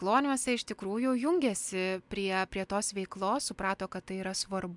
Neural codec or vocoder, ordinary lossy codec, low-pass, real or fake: none; MP3, 96 kbps; 10.8 kHz; real